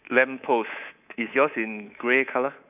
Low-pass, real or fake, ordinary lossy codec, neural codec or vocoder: 3.6 kHz; fake; none; codec, 24 kHz, 3.1 kbps, DualCodec